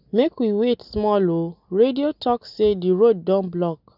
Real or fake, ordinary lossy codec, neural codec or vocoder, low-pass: real; none; none; 5.4 kHz